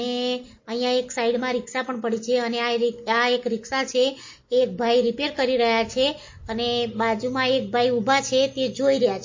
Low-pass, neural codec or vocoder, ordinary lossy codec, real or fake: 7.2 kHz; none; MP3, 32 kbps; real